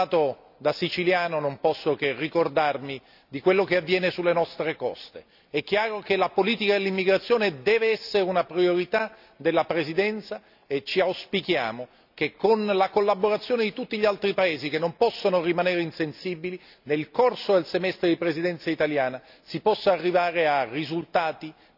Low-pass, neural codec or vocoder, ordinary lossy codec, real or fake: 5.4 kHz; none; none; real